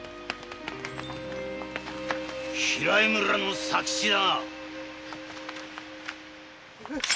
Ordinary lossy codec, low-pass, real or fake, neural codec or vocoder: none; none; real; none